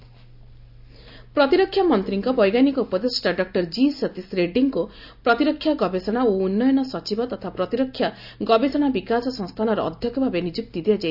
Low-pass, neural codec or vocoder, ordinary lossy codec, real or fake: 5.4 kHz; none; none; real